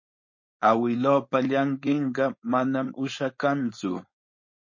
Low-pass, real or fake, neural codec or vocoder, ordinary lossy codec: 7.2 kHz; fake; codec, 16 kHz, 4.8 kbps, FACodec; MP3, 32 kbps